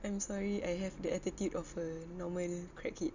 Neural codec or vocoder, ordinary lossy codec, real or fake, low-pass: none; none; real; 7.2 kHz